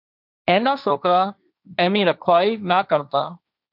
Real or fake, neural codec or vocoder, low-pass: fake; codec, 16 kHz, 1.1 kbps, Voila-Tokenizer; 5.4 kHz